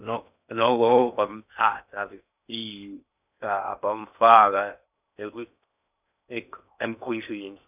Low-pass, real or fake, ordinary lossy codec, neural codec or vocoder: 3.6 kHz; fake; none; codec, 16 kHz in and 24 kHz out, 0.6 kbps, FocalCodec, streaming, 2048 codes